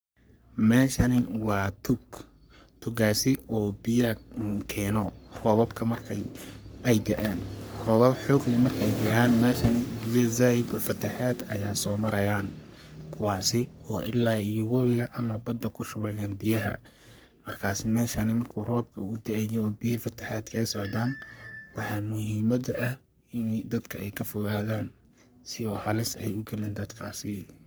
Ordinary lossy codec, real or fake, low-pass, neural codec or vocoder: none; fake; none; codec, 44.1 kHz, 3.4 kbps, Pupu-Codec